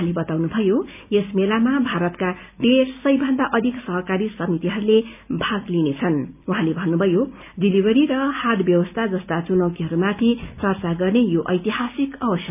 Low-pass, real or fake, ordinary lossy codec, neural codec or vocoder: 3.6 kHz; real; none; none